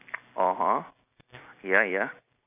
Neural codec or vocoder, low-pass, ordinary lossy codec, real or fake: none; 3.6 kHz; none; real